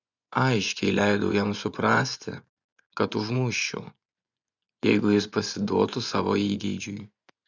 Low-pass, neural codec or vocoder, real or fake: 7.2 kHz; vocoder, 24 kHz, 100 mel bands, Vocos; fake